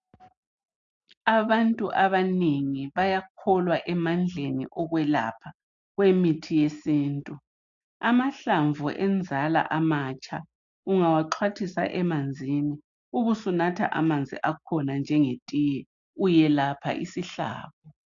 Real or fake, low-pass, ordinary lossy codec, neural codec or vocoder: real; 7.2 kHz; AAC, 64 kbps; none